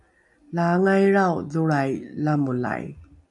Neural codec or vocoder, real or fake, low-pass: none; real; 10.8 kHz